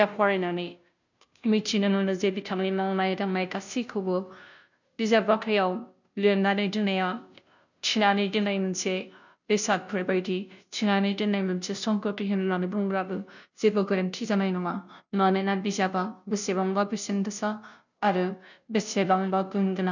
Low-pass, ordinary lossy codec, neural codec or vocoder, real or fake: 7.2 kHz; none; codec, 16 kHz, 0.5 kbps, FunCodec, trained on Chinese and English, 25 frames a second; fake